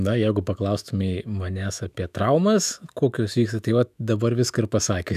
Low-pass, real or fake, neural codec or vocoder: 14.4 kHz; fake; autoencoder, 48 kHz, 128 numbers a frame, DAC-VAE, trained on Japanese speech